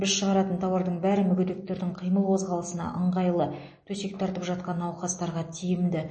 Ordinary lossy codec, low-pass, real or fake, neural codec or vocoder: MP3, 32 kbps; 9.9 kHz; real; none